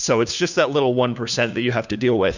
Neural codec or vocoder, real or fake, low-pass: codec, 16 kHz, 2 kbps, FunCodec, trained on Chinese and English, 25 frames a second; fake; 7.2 kHz